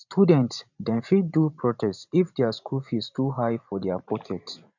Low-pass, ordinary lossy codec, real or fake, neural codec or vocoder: 7.2 kHz; none; fake; vocoder, 44.1 kHz, 128 mel bands every 512 samples, BigVGAN v2